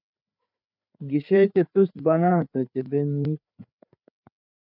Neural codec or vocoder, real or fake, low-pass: codec, 16 kHz, 4 kbps, FreqCodec, larger model; fake; 5.4 kHz